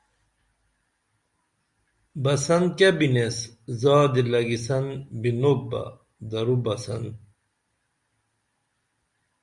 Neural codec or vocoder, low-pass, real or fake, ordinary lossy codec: none; 10.8 kHz; real; Opus, 64 kbps